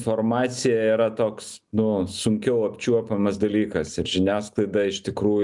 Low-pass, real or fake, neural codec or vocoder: 10.8 kHz; real; none